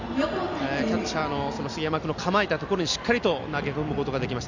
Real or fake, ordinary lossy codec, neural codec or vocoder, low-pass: real; Opus, 64 kbps; none; 7.2 kHz